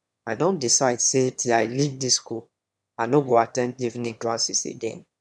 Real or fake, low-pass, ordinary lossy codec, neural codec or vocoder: fake; none; none; autoencoder, 22.05 kHz, a latent of 192 numbers a frame, VITS, trained on one speaker